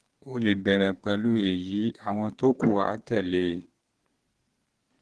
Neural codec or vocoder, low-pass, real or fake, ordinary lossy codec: codec, 32 kHz, 1.9 kbps, SNAC; 10.8 kHz; fake; Opus, 16 kbps